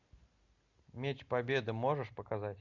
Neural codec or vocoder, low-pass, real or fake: none; 7.2 kHz; real